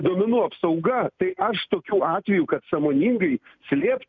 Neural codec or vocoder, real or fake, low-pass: none; real; 7.2 kHz